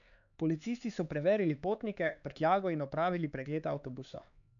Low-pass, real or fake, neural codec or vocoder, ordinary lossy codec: 7.2 kHz; fake; codec, 16 kHz, 4 kbps, X-Codec, HuBERT features, trained on LibriSpeech; none